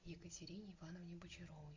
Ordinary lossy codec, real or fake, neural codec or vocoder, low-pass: AAC, 48 kbps; real; none; 7.2 kHz